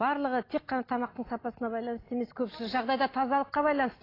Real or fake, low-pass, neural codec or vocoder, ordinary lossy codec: real; 5.4 kHz; none; AAC, 24 kbps